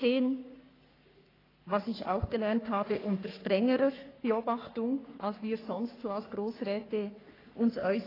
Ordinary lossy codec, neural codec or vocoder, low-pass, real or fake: AAC, 24 kbps; codec, 44.1 kHz, 3.4 kbps, Pupu-Codec; 5.4 kHz; fake